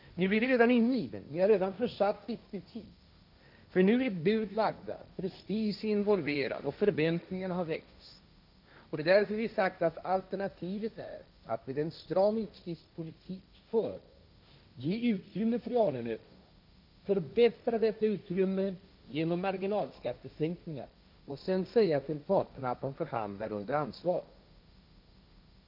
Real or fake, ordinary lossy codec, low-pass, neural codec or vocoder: fake; none; 5.4 kHz; codec, 16 kHz, 1.1 kbps, Voila-Tokenizer